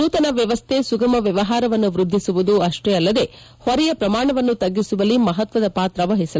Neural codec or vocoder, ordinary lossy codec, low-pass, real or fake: none; none; none; real